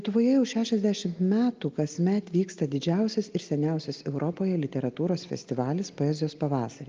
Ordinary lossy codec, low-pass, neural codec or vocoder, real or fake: Opus, 24 kbps; 7.2 kHz; none; real